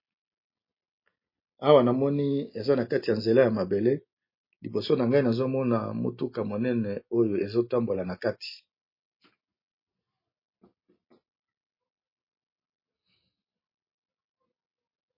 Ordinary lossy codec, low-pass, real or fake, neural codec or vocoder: MP3, 24 kbps; 5.4 kHz; real; none